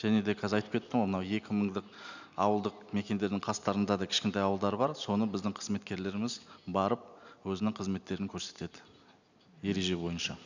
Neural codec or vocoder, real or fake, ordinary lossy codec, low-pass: none; real; none; 7.2 kHz